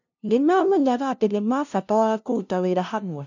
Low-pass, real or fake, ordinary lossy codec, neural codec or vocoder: 7.2 kHz; fake; none; codec, 16 kHz, 0.5 kbps, FunCodec, trained on LibriTTS, 25 frames a second